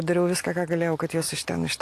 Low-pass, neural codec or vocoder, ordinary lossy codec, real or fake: 14.4 kHz; none; AAC, 64 kbps; real